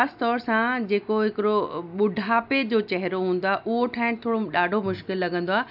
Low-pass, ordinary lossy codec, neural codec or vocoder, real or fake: 5.4 kHz; AAC, 48 kbps; none; real